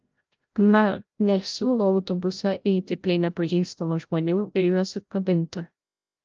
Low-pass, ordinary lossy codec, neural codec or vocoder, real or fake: 7.2 kHz; Opus, 24 kbps; codec, 16 kHz, 0.5 kbps, FreqCodec, larger model; fake